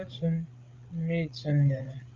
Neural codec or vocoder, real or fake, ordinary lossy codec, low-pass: codec, 16 kHz, 8 kbps, FreqCodec, larger model; fake; Opus, 24 kbps; 7.2 kHz